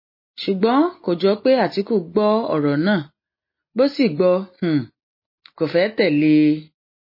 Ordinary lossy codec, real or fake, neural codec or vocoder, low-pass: MP3, 24 kbps; real; none; 5.4 kHz